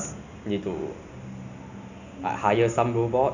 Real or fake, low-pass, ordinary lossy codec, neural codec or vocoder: real; 7.2 kHz; none; none